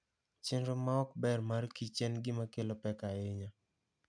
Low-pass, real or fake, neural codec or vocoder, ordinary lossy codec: 9.9 kHz; real; none; none